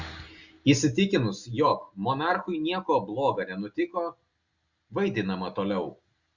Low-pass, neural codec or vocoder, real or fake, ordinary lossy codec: 7.2 kHz; none; real; Opus, 64 kbps